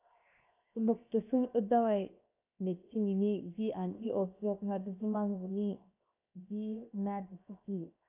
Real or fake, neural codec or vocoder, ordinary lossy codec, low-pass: fake; codec, 16 kHz, 0.7 kbps, FocalCodec; AAC, 32 kbps; 3.6 kHz